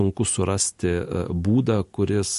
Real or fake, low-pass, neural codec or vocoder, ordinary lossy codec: real; 14.4 kHz; none; MP3, 48 kbps